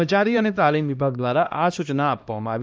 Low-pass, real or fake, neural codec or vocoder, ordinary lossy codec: none; fake; codec, 16 kHz, 1 kbps, X-Codec, HuBERT features, trained on LibriSpeech; none